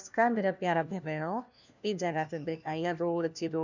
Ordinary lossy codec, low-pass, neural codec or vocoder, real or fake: none; 7.2 kHz; codec, 16 kHz, 1 kbps, FunCodec, trained on LibriTTS, 50 frames a second; fake